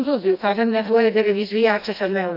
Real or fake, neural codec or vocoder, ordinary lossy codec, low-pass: fake; codec, 16 kHz, 1 kbps, FreqCodec, smaller model; none; 5.4 kHz